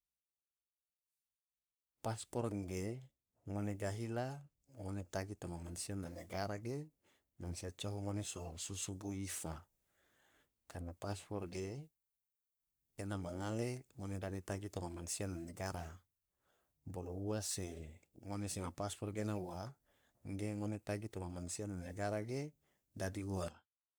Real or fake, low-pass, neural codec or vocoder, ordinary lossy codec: fake; none; codec, 44.1 kHz, 3.4 kbps, Pupu-Codec; none